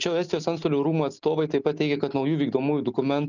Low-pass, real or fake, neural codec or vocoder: 7.2 kHz; real; none